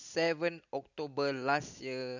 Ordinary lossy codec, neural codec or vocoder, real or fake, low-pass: none; none; real; 7.2 kHz